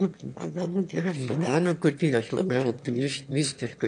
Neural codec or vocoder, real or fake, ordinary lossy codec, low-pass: autoencoder, 22.05 kHz, a latent of 192 numbers a frame, VITS, trained on one speaker; fake; MP3, 64 kbps; 9.9 kHz